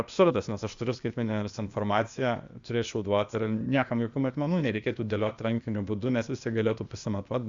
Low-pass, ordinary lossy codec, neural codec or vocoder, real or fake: 7.2 kHz; Opus, 64 kbps; codec, 16 kHz, 0.8 kbps, ZipCodec; fake